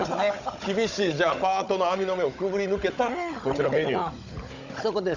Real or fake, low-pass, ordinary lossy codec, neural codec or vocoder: fake; 7.2 kHz; Opus, 64 kbps; codec, 16 kHz, 16 kbps, FunCodec, trained on Chinese and English, 50 frames a second